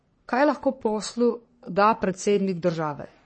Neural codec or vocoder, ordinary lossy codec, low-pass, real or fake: codec, 44.1 kHz, 3.4 kbps, Pupu-Codec; MP3, 32 kbps; 9.9 kHz; fake